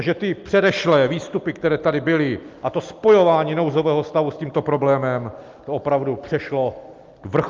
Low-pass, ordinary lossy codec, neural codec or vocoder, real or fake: 7.2 kHz; Opus, 32 kbps; none; real